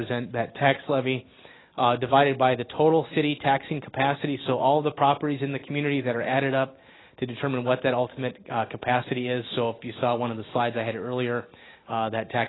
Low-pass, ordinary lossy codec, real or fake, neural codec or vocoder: 7.2 kHz; AAC, 16 kbps; real; none